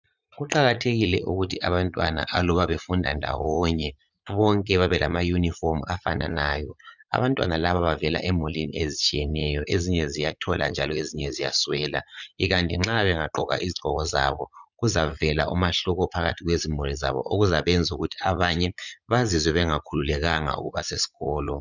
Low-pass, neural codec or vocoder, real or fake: 7.2 kHz; vocoder, 44.1 kHz, 80 mel bands, Vocos; fake